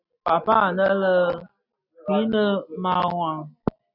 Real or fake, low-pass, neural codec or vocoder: real; 5.4 kHz; none